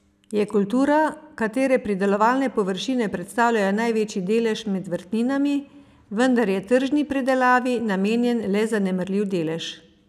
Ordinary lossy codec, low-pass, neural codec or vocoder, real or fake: none; 14.4 kHz; none; real